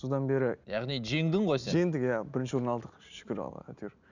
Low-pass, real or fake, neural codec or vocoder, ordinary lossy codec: 7.2 kHz; real; none; none